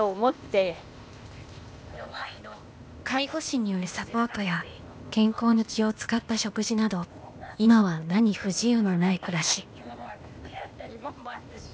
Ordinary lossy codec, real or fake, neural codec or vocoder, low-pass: none; fake; codec, 16 kHz, 0.8 kbps, ZipCodec; none